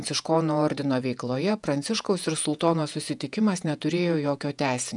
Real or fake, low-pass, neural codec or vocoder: fake; 10.8 kHz; vocoder, 48 kHz, 128 mel bands, Vocos